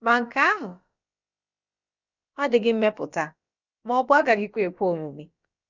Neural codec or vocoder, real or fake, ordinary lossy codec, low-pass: codec, 16 kHz, about 1 kbps, DyCAST, with the encoder's durations; fake; Opus, 64 kbps; 7.2 kHz